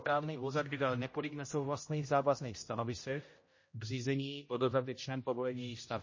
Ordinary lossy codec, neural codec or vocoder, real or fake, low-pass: MP3, 32 kbps; codec, 16 kHz, 0.5 kbps, X-Codec, HuBERT features, trained on general audio; fake; 7.2 kHz